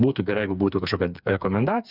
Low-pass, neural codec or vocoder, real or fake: 5.4 kHz; codec, 16 kHz, 4 kbps, FreqCodec, smaller model; fake